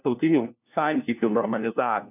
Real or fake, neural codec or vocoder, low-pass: fake; codec, 16 kHz, 1 kbps, FunCodec, trained on LibriTTS, 50 frames a second; 3.6 kHz